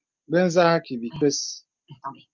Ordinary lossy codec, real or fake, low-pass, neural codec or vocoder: Opus, 24 kbps; real; 7.2 kHz; none